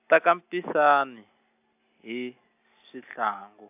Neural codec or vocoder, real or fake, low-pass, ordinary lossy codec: none; real; 3.6 kHz; AAC, 32 kbps